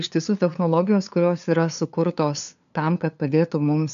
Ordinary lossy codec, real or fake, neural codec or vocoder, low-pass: AAC, 96 kbps; fake; codec, 16 kHz, 2 kbps, FunCodec, trained on LibriTTS, 25 frames a second; 7.2 kHz